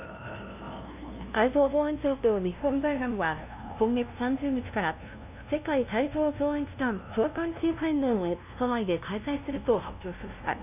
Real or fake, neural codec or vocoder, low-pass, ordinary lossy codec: fake; codec, 16 kHz, 0.5 kbps, FunCodec, trained on LibriTTS, 25 frames a second; 3.6 kHz; none